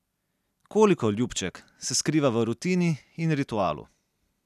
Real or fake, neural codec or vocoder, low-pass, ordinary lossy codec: real; none; 14.4 kHz; none